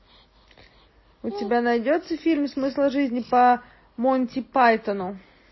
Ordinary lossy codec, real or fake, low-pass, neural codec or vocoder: MP3, 24 kbps; real; 7.2 kHz; none